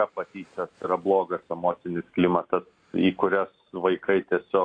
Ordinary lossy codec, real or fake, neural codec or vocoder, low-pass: AAC, 48 kbps; real; none; 9.9 kHz